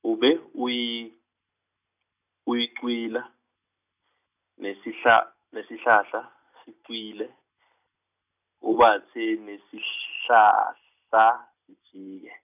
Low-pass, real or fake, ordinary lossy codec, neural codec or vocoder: 3.6 kHz; real; none; none